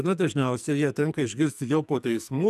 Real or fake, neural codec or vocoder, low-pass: fake; codec, 44.1 kHz, 2.6 kbps, SNAC; 14.4 kHz